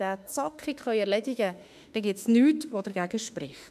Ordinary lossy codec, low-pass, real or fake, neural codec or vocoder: none; 14.4 kHz; fake; autoencoder, 48 kHz, 32 numbers a frame, DAC-VAE, trained on Japanese speech